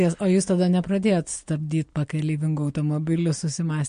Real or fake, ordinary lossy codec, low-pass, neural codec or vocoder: real; MP3, 48 kbps; 9.9 kHz; none